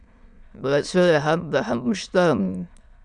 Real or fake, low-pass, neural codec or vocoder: fake; 9.9 kHz; autoencoder, 22.05 kHz, a latent of 192 numbers a frame, VITS, trained on many speakers